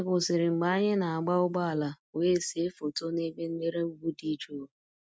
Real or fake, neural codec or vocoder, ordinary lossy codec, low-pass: real; none; none; none